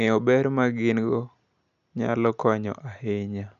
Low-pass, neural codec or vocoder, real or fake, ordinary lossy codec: 7.2 kHz; none; real; none